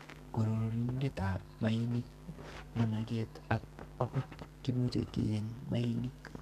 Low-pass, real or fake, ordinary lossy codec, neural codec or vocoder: 14.4 kHz; fake; none; codec, 32 kHz, 1.9 kbps, SNAC